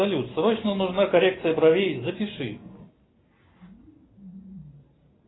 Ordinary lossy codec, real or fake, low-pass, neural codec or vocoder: AAC, 16 kbps; real; 7.2 kHz; none